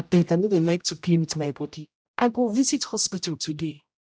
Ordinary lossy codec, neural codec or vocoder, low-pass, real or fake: none; codec, 16 kHz, 0.5 kbps, X-Codec, HuBERT features, trained on general audio; none; fake